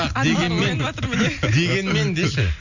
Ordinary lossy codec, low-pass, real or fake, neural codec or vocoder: none; 7.2 kHz; real; none